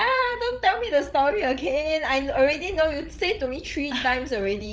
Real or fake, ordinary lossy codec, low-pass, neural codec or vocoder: fake; none; none; codec, 16 kHz, 8 kbps, FreqCodec, larger model